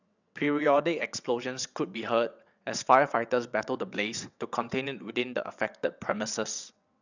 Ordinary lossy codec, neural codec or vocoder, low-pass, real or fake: none; vocoder, 22.05 kHz, 80 mel bands, WaveNeXt; 7.2 kHz; fake